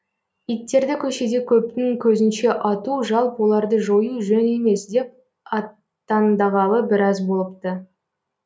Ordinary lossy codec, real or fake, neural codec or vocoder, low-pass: none; real; none; none